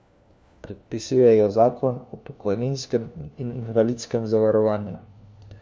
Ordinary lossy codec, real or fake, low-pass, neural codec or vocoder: none; fake; none; codec, 16 kHz, 1 kbps, FunCodec, trained on LibriTTS, 50 frames a second